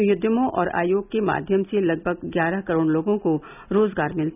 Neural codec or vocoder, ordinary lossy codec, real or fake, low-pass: none; none; real; 3.6 kHz